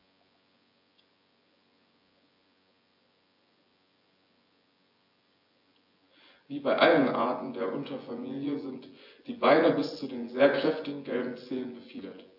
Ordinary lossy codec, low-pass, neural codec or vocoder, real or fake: AAC, 48 kbps; 5.4 kHz; vocoder, 24 kHz, 100 mel bands, Vocos; fake